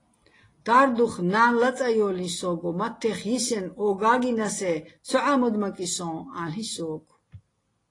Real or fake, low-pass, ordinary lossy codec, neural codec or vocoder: real; 10.8 kHz; AAC, 32 kbps; none